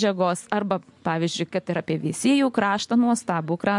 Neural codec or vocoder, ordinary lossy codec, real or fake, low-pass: none; MP3, 64 kbps; real; 10.8 kHz